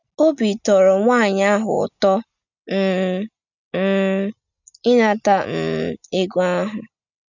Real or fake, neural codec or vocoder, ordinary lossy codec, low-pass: real; none; none; 7.2 kHz